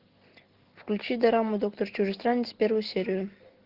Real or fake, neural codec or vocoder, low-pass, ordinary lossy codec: real; none; 5.4 kHz; Opus, 16 kbps